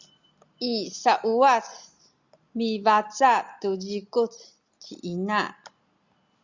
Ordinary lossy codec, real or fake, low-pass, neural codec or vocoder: Opus, 64 kbps; real; 7.2 kHz; none